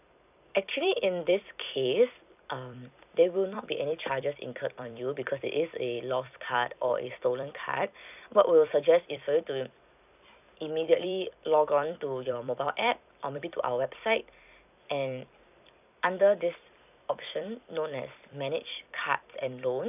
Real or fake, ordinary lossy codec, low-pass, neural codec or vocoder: fake; none; 3.6 kHz; vocoder, 44.1 kHz, 128 mel bands every 256 samples, BigVGAN v2